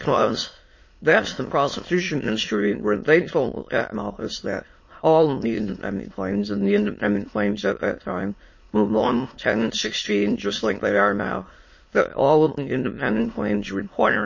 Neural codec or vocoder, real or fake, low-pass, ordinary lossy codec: autoencoder, 22.05 kHz, a latent of 192 numbers a frame, VITS, trained on many speakers; fake; 7.2 kHz; MP3, 32 kbps